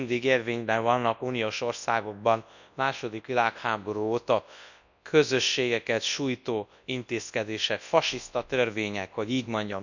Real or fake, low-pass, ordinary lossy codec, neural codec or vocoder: fake; 7.2 kHz; none; codec, 24 kHz, 0.9 kbps, WavTokenizer, large speech release